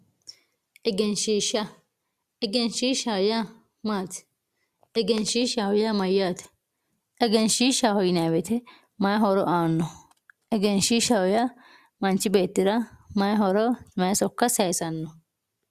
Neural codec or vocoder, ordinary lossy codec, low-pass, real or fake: none; Opus, 64 kbps; 14.4 kHz; real